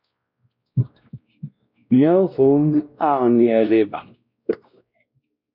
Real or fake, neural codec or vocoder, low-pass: fake; codec, 16 kHz, 1 kbps, X-Codec, WavLM features, trained on Multilingual LibriSpeech; 5.4 kHz